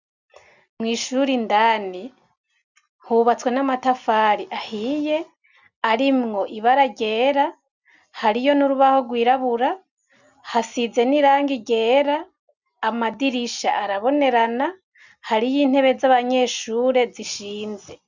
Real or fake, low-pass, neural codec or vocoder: real; 7.2 kHz; none